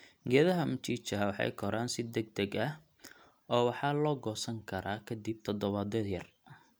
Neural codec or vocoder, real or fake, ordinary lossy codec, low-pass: none; real; none; none